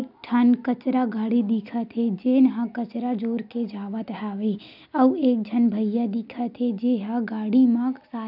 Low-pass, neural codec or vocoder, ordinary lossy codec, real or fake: 5.4 kHz; none; none; real